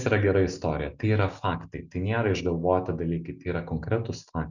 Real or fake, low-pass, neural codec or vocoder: real; 7.2 kHz; none